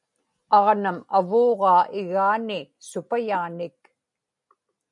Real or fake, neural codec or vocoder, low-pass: real; none; 10.8 kHz